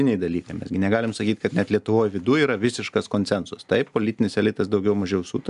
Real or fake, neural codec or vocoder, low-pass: real; none; 10.8 kHz